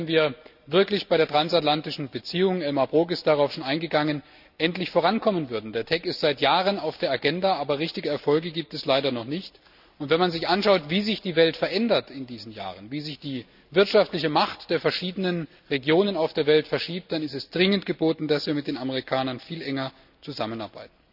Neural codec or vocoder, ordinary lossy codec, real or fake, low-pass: none; none; real; 5.4 kHz